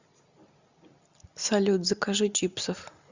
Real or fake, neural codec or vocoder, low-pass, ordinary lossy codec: real; none; 7.2 kHz; Opus, 64 kbps